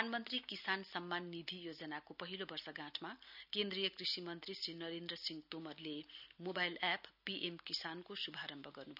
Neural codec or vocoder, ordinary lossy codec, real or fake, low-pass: none; none; real; 5.4 kHz